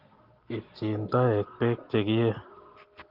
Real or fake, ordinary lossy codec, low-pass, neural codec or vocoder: real; Opus, 16 kbps; 5.4 kHz; none